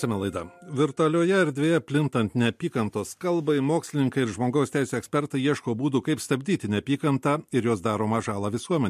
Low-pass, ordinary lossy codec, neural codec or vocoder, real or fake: 14.4 kHz; MP3, 64 kbps; none; real